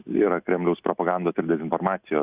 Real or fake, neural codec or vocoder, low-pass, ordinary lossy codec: real; none; 3.6 kHz; Opus, 64 kbps